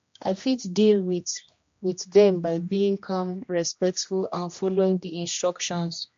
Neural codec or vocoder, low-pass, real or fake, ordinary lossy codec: codec, 16 kHz, 1 kbps, X-Codec, HuBERT features, trained on general audio; 7.2 kHz; fake; MP3, 48 kbps